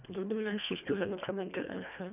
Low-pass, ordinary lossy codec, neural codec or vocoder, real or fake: 3.6 kHz; none; codec, 24 kHz, 1.5 kbps, HILCodec; fake